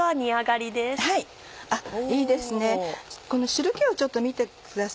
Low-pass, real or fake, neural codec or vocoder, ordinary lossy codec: none; real; none; none